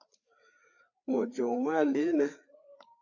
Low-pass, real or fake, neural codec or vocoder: 7.2 kHz; fake; codec, 16 kHz, 4 kbps, FreqCodec, larger model